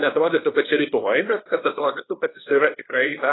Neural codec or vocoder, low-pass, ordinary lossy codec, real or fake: codec, 24 kHz, 0.9 kbps, WavTokenizer, small release; 7.2 kHz; AAC, 16 kbps; fake